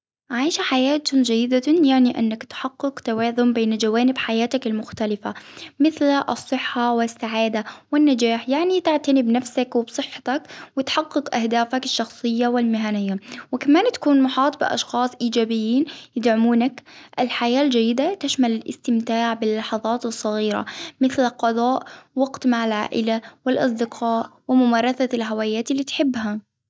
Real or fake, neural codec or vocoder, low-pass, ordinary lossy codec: real; none; none; none